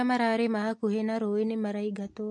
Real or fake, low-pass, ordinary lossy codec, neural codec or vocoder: real; 10.8 kHz; MP3, 48 kbps; none